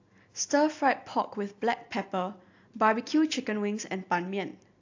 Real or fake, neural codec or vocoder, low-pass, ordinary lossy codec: real; none; 7.2 kHz; AAC, 48 kbps